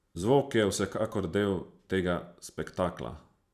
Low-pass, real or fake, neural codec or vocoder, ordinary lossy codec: 14.4 kHz; fake; vocoder, 44.1 kHz, 128 mel bands every 512 samples, BigVGAN v2; none